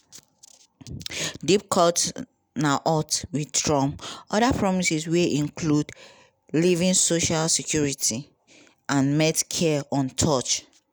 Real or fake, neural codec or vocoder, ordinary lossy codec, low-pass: real; none; none; none